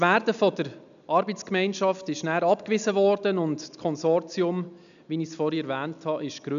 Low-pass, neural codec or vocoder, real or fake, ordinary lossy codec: 7.2 kHz; none; real; none